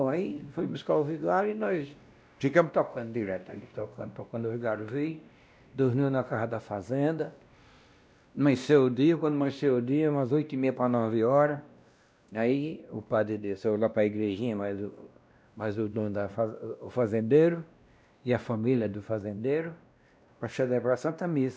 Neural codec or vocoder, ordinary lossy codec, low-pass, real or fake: codec, 16 kHz, 1 kbps, X-Codec, WavLM features, trained on Multilingual LibriSpeech; none; none; fake